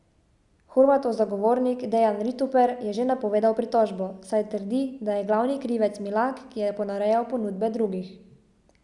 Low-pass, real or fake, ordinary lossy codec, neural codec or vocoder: 10.8 kHz; real; Opus, 64 kbps; none